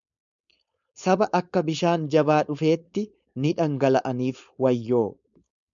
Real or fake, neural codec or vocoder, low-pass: fake; codec, 16 kHz, 4.8 kbps, FACodec; 7.2 kHz